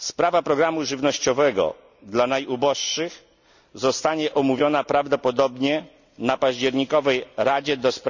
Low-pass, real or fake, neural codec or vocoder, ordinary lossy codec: 7.2 kHz; real; none; none